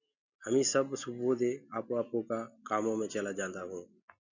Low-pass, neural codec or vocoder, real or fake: 7.2 kHz; none; real